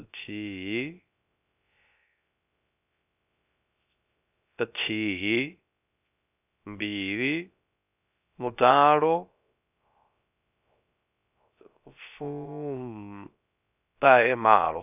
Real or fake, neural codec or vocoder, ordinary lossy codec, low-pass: fake; codec, 16 kHz, 0.3 kbps, FocalCodec; none; 3.6 kHz